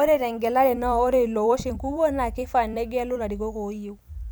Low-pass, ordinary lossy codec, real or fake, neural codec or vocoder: none; none; fake; vocoder, 44.1 kHz, 128 mel bands every 256 samples, BigVGAN v2